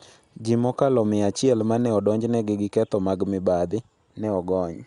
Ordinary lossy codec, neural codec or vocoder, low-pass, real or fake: Opus, 64 kbps; none; 10.8 kHz; real